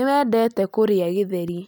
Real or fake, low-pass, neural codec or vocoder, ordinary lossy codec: real; none; none; none